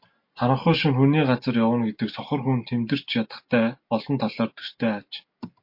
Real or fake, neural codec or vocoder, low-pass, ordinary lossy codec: real; none; 5.4 kHz; MP3, 32 kbps